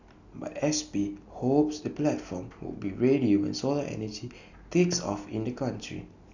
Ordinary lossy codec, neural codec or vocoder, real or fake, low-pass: none; none; real; 7.2 kHz